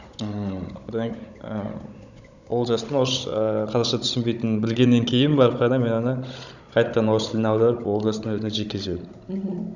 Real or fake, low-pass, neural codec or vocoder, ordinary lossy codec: fake; 7.2 kHz; codec, 16 kHz, 16 kbps, FunCodec, trained on Chinese and English, 50 frames a second; none